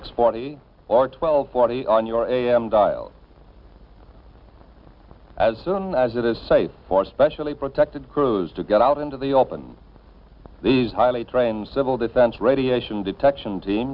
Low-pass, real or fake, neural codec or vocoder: 5.4 kHz; real; none